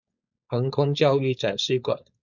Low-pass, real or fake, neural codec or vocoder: 7.2 kHz; fake; codec, 16 kHz, 4.8 kbps, FACodec